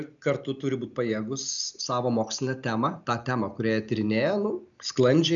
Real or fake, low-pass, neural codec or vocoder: real; 7.2 kHz; none